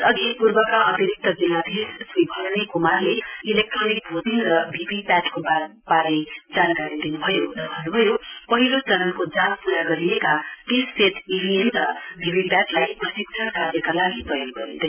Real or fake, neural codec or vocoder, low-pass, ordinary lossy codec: real; none; 3.6 kHz; none